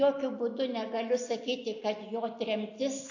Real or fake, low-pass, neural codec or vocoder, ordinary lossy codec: real; 7.2 kHz; none; AAC, 48 kbps